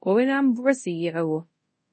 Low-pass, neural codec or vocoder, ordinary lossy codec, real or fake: 9.9 kHz; codec, 24 kHz, 0.5 kbps, DualCodec; MP3, 32 kbps; fake